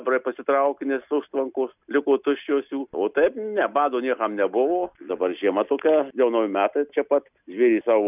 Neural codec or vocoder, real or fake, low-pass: none; real; 3.6 kHz